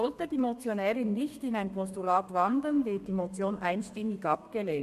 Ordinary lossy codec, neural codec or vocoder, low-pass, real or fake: MP3, 64 kbps; codec, 32 kHz, 1.9 kbps, SNAC; 14.4 kHz; fake